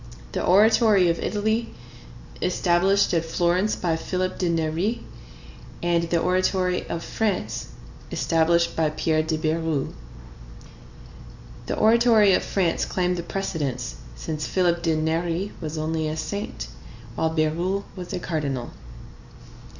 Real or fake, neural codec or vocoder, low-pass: real; none; 7.2 kHz